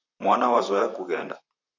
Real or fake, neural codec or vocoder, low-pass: fake; vocoder, 44.1 kHz, 128 mel bands, Pupu-Vocoder; 7.2 kHz